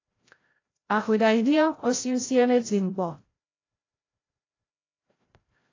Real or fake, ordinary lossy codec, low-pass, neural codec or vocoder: fake; AAC, 32 kbps; 7.2 kHz; codec, 16 kHz, 0.5 kbps, FreqCodec, larger model